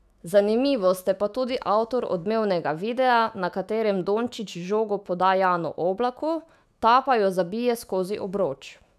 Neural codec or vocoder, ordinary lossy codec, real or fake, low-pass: autoencoder, 48 kHz, 128 numbers a frame, DAC-VAE, trained on Japanese speech; none; fake; 14.4 kHz